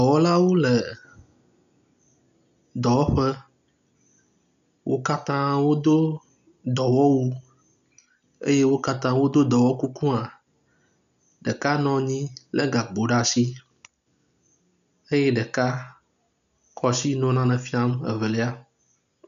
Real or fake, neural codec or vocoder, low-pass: real; none; 7.2 kHz